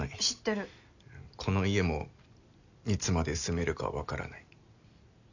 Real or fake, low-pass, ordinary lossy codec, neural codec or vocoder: real; 7.2 kHz; none; none